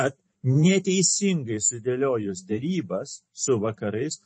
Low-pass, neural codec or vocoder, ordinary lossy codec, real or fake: 10.8 kHz; vocoder, 44.1 kHz, 128 mel bands every 512 samples, BigVGAN v2; MP3, 32 kbps; fake